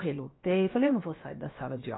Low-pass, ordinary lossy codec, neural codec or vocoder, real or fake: 7.2 kHz; AAC, 16 kbps; codec, 16 kHz, about 1 kbps, DyCAST, with the encoder's durations; fake